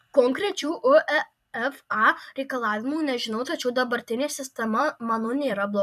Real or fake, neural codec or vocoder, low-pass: fake; vocoder, 44.1 kHz, 128 mel bands every 256 samples, BigVGAN v2; 14.4 kHz